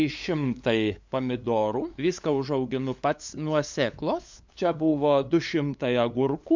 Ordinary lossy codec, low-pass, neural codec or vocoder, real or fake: MP3, 64 kbps; 7.2 kHz; codec, 16 kHz, 8 kbps, FunCodec, trained on LibriTTS, 25 frames a second; fake